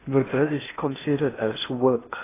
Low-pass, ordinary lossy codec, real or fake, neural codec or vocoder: 3.6 kHz; AAC, 16 kbps; fake; codec, 16 kHz in and 24 kHz out, 0.8 kbps, FocalCodec, streaming, 65536 codes